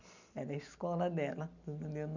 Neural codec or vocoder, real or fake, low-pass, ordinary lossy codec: none; real; 7.2 kHz; none